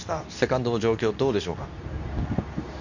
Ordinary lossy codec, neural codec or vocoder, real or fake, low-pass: none; codec, 24 kHz, 0.9 kbps, WavTokenizer, medium speech release version 2; fake; 7.2 kHz